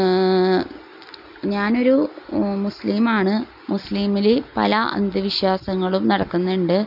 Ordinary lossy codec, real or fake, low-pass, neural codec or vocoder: none; real; 5.4 kHz; none